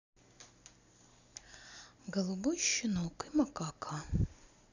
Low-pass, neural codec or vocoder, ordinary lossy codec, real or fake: 7.2 kHz; none; none; real